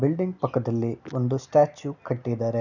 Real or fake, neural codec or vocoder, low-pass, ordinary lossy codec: real; none; 7.2 kHz; none